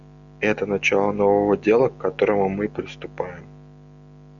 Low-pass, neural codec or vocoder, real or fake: 7.2 kHz; none; real